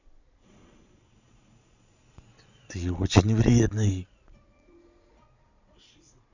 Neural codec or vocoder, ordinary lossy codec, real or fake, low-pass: vocoder, 22.05 kHz, 80 mel bands, Vocos; none; fake; 7.2 kHz